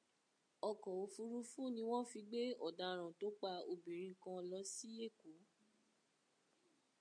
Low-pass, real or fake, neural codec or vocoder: 9.9 kHz; real; none